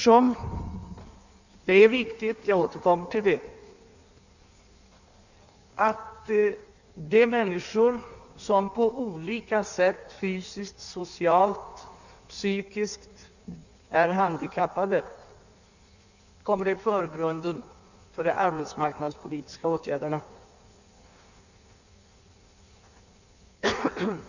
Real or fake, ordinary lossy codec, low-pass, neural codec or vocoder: fake; none; 7.2 kHz; codec, 16 kHz in and 24 kHz out, 1.1 kbps, FireRedTTS-2 codec